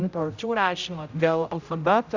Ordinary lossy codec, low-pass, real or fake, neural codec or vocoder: Opus, 64 kbps; 7.2 kHz; fake; codec, 16 kHz, 0.5 kbps, X-Codec, HuBERT features, trained on general audio